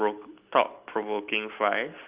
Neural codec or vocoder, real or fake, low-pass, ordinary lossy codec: none; real; 3.6 kHz; Opus, 24 kbps